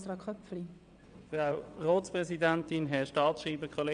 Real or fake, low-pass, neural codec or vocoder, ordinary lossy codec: fake; 9.9 kHz; vocoder, 22.05 kHz, 80 mel bands, WaveNeXt; MP3, 96 kbps